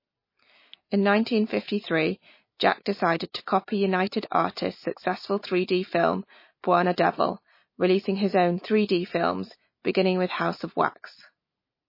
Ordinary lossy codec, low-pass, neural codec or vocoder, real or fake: MP3, 24 kbps; 5.4 kHz; none; real